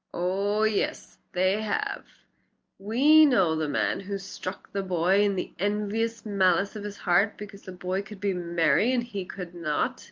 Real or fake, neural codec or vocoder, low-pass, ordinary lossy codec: real; none; 7.2 kHz; Opus, 24 kbps